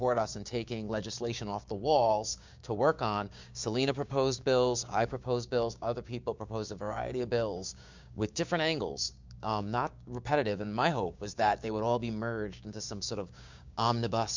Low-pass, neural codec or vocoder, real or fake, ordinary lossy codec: 7.2 kHz; codec, 16 kHz, 6 kbps, DAC; fake; MP3, 64 kbps